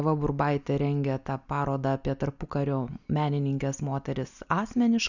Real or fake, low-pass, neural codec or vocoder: real; 7.2 kHz; none